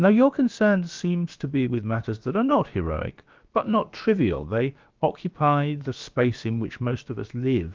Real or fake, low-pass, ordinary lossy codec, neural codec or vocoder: fake; 7.2 kHz; Opus, 24 kbps; codec, 16 kHz, about 1 kbps, DyCAST, with the encoder's durations